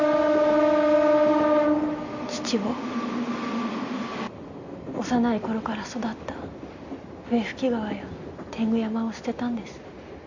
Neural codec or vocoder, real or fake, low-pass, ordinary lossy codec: none; real; 7.2 kHz; none